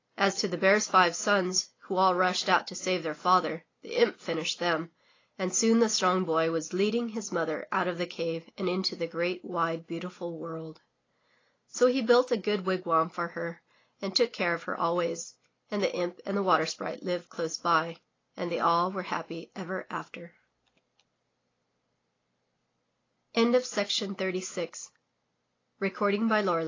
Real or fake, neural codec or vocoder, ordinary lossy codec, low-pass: real; none; AAC, 32 kbps; 7.2 kHz